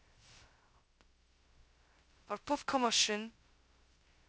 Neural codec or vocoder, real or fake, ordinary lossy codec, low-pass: codec, 16 kHz, 0.2 kbps, FocalCodec; fake; none; none